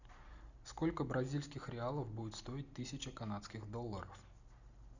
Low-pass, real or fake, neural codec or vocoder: 7.2 kHz; real; none